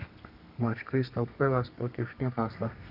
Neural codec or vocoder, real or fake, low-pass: codec, 32 kHz, 1.9 kbps, SNAC; fake; 5.4 kHz